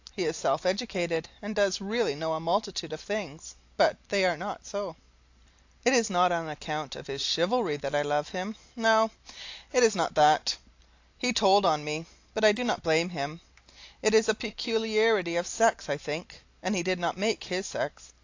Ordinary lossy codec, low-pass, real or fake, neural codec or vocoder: AAC, 48 kbps; 7.2 kHz; real; none